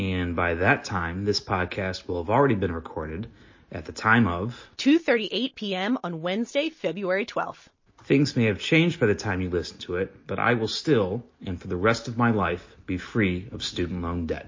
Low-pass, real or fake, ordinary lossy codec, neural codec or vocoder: 7.2 kHz; real; MP3, 32 kbps; none